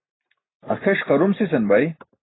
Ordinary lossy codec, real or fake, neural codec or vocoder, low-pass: AAC, 16 kbps; real; none; 7.2 kHz